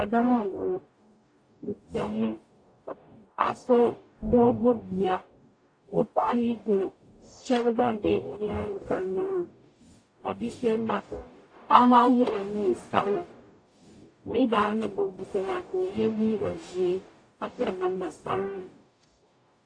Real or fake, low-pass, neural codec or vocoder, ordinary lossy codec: fake; 9.9 kHz; codec, 44.1 kHz, 0.9 kbps, DAC; AAC, 48 kbps